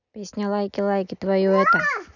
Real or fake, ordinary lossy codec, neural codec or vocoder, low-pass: real; none; none; 7.2 kHz